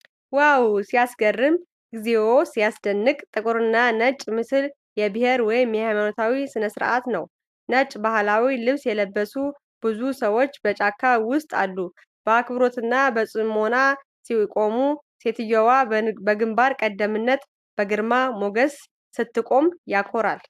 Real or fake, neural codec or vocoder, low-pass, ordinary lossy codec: real; none; 14.4 kHz; AAC, 96 kbps